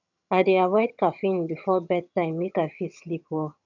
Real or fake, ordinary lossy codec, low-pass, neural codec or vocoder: fake; none; 7.2 kHz; vocoder, 22.05 kHz, 80 mel bands, HiFi-GAN